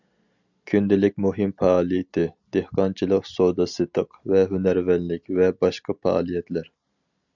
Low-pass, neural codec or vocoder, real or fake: 7.2 kHz; none; real